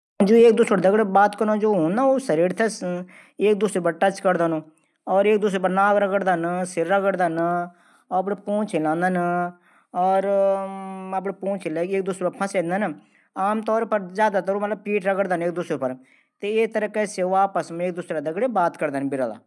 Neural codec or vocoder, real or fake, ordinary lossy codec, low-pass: none; real; none; none